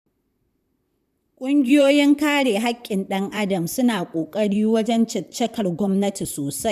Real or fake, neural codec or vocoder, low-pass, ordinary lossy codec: fake; vocoder, 44.1 kHz, 128 mel bands, Pupu-Vocoder; 14.4 kHz; none